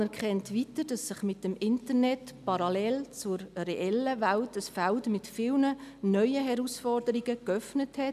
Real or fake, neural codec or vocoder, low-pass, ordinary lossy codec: real; none; 14.4 kHz; none